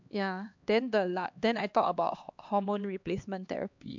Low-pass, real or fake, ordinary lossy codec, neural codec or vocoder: 7.2 kHz; fake; MP3, 64 kbps; codec, 16 kHz, 2 kbps, X-Codec, HuBERT features, trained on LibriSpeech